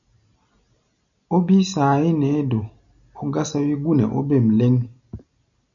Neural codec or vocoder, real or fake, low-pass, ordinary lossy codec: none; real; 7.2 kHz; MP3, 96 kbps